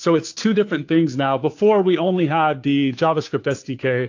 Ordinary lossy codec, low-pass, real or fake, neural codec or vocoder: AAC, 48 kbps; 7.2 kHz; fake; codec, 16 kHz, 2 kbps, FunCodec, trained on Chinese and English, 25 frames a second